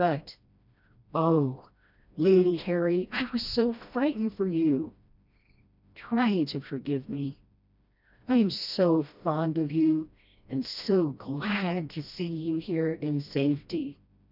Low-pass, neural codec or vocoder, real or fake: 5.4 kHz; codec, 16 kHz, 1 kbps, FreqCodec, smaller model; fake